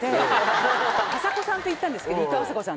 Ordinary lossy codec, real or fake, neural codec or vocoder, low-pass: none; real; none; none